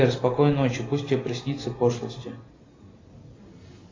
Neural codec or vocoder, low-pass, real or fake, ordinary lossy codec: none; 7.2 kHz; real; AAC, 32 kbps